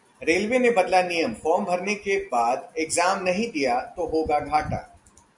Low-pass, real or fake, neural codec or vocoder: 10.8 kHz; real; none